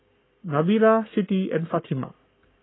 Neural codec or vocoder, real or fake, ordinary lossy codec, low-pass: codec, 44.1 kHz, 7.8 kbps, Pupu-Codec; fake; AAC, 16 kbps; 7.2 kHz